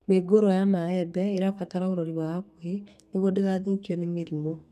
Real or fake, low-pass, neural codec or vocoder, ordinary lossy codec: fake; 14.4 kHz; codec, 32 kHz, 1.9 kbps, SNAC; none